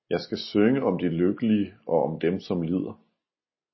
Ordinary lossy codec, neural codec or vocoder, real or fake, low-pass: MP3, 24 kbps; none; real; 7.2 kHz